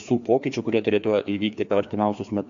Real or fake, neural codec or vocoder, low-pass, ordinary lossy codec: fake; codec, 16 kHz, 2 kbps, FreqCodec, larger model; 7.2 kHz; MP3, 64 kbps